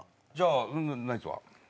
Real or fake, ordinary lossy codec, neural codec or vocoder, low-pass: real; none; none; none